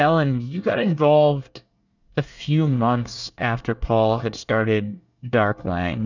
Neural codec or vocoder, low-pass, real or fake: codec, 24 kHz, 1 kbps, SNAC; 7.2 kHz; fake